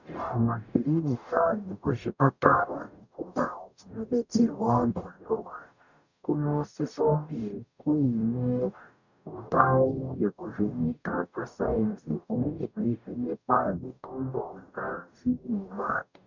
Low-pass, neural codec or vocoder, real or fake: 7.2 kHz; codec, 44.1 kHz, 0.9 kbps, DAC; fake